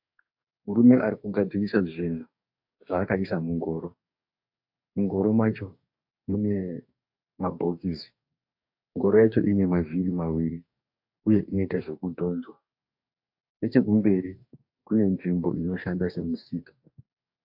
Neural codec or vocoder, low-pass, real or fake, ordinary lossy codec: codec, 44.1 kHz, 2.6 kbps, DAC; 5.4 kHz; fake; AAC, 48 kbps